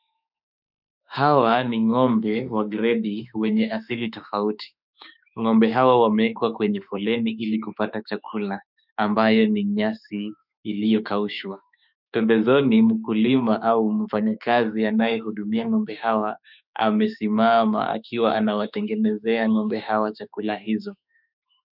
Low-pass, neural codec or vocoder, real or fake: 5.4 kHz; autoencoder, 48 kHz, 32 numbers a frame, DAC-VAE, trained on Japanese speech; fake